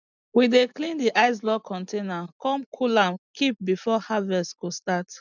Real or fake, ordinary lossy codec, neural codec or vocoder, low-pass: real; none; none; 7.2 kHz